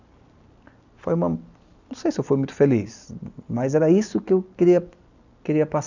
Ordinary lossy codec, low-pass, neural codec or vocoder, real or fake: none; 7.2 kHz; none; real